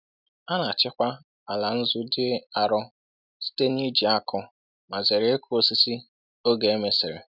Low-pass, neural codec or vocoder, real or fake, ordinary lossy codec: 5.4 kHz; none; real; none